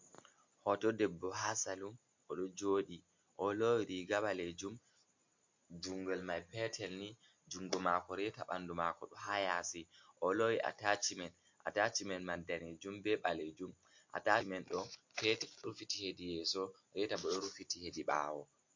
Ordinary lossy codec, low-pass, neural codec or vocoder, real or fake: MP3, 48 kbps; 7.2 kHz; none; real